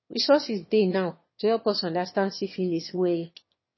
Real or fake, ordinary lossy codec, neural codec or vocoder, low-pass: fake; MP3, 24 kbps; autoencoder, 22.05 kHz, a latent of 192 numbers a frame, VITS, trained on one speaker; 7.2 kHz